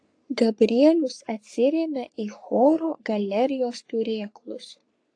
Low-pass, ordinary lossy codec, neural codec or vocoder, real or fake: 9.9 kHz; AAC, 48 kbps; codec, 44.1 kHz, 3.4 kbps, Pupu-Codec; fake